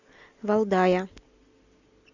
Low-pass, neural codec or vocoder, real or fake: 7.2 kHz; none; real